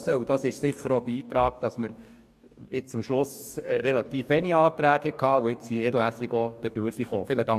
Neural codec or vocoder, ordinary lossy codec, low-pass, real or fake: codec, 44.1 kHz, 2.6 kbps, DAC; AAC, 96 kbps; 14.4 kHz; fake